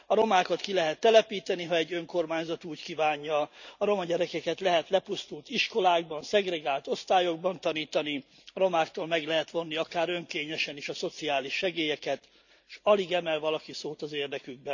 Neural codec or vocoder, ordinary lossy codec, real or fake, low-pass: none; none; real; 7.2 kHz